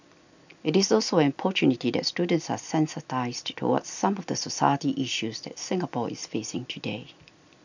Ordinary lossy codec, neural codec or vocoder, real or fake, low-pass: none; none; real; 7.2 kHz